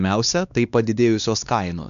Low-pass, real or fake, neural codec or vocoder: 7.2 kHz; fake; codec, 16 kHz, 2 kbps, X-Codec, WavLM features, trained on Multilingual LibriSpeech